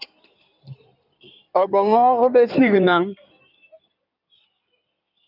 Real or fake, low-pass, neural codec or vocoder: fake; 5.4 kHz; codec, 16 kHz in and 24 kHz out, 2.2 kbps, FireRedTTS-2 codec